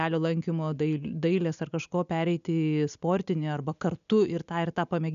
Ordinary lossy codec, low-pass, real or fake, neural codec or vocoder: MP3, 96 kbps; 7.2 kHz; real; none